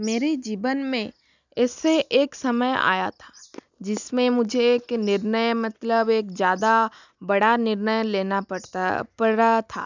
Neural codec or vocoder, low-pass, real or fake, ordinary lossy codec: none; 7.2 kHz; real; none